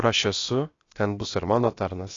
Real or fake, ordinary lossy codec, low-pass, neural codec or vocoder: fake; AAC, 32 kbps; 7.2 kHz; codec, 16 kHz, about 1 kbps, DyCAST, with the encoder's durations